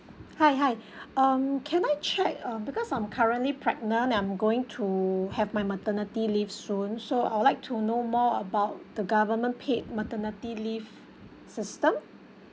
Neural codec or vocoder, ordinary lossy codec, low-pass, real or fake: none; none; none; real